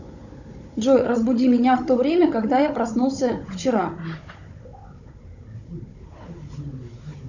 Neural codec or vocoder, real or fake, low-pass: codec, 16 kHz, 16 kbps, FunCodec, trained on Chinese and English, 50 frames a second; fake; 7.2 kHz